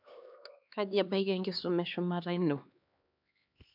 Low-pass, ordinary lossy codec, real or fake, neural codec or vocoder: 5.4 kHz; AAC, 48 kbps; fake; codec, 16 kHz, 2 kbps, X-Codec, HuBERT features, trained on LibriSpeech